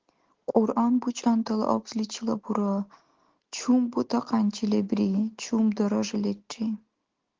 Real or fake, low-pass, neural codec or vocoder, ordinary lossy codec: real; 7.2 kHz; none; Opus, 16 kbps